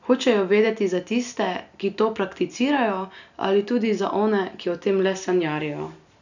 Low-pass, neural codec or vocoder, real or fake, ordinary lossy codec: 7.2 kHz; none; real; none